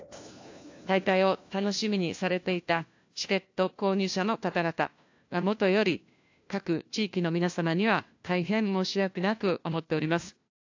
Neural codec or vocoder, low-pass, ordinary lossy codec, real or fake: codec, 16 kHz, 1 kbps, FunCodec, trained on LibriTTS, 50 frames a second; 7.2 kHz; AAC, 48 kbps; fake